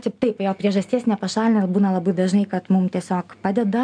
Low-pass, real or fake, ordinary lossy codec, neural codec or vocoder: 9.9 kHz; real; MP3, 96 kbps; none